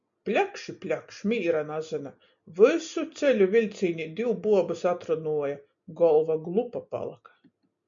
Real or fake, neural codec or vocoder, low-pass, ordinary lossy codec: real; none; 7.2 kHz; MP3, 64 kbps